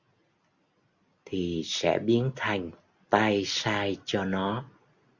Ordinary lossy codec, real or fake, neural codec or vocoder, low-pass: Opus, 64 kbps; real; none; 7.2 kHz